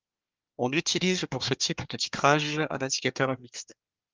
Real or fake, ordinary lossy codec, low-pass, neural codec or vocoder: fake; Opus, 24 kbps; 7.2 kHz; codec, 24 kHz, 1 kbps, SNAC